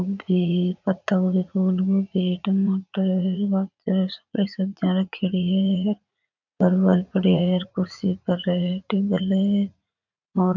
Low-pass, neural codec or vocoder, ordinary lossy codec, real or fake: 7.2 kHz; vocoder, 44.1 kHz, 80 mel bands, Vocos; none; fake